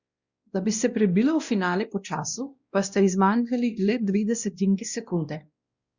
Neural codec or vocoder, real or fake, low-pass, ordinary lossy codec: codec, 16 kHz, 1 kbps, X-Codec, WavLM features, trained on Multilingual LibriSpeech; fake; 7.2 kHz; Opus, 64 kbps